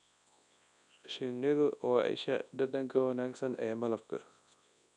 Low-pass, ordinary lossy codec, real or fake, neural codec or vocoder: 10.8 kHz; none; fake; codec, 24 kHz, 0.9 kbps, WavTokenizer, large speech release